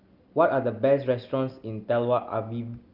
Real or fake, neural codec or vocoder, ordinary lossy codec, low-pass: real; none; Opus, 32 kbps; 5.4 kHz